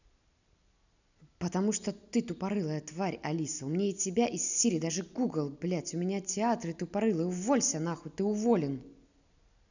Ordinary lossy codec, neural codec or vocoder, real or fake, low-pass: none; none; real; 7.2 kHz